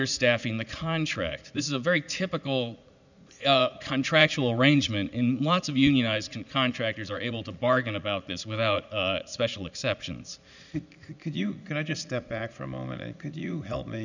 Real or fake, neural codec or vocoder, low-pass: fake; vocoder, 44.1 kHz, 80 mel bands, Vocos; 7.2 kHz